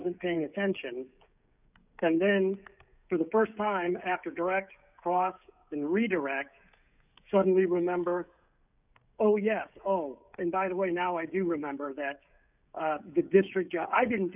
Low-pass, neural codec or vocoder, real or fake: 3.6 kHz; codec, 16 kHz, 16 kbps, FreqCodec, smaller model; fake